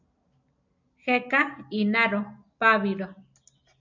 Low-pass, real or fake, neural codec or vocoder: 7.2 kHz; real; none